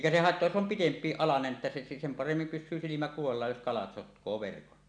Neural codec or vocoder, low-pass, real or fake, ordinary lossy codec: none; 9.9 kHz; real; none